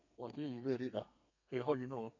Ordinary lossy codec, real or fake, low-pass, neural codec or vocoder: none; fake; 7.2 kHz; codec, 32 kHz, 1.9 kbps, SNAC